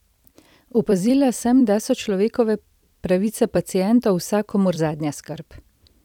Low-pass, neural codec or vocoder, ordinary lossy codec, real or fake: 19.8 kHz; vocoder, 44.1 kHz, 128 mel bands every 256 samples, BigVGAN v2; none; fake